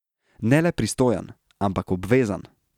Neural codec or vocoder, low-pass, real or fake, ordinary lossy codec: none; 19.8 kHz; real; none